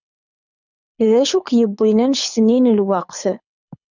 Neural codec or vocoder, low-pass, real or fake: codec, 24 kHz, 6 kbps, HILCodec; 7.2 kHz; fake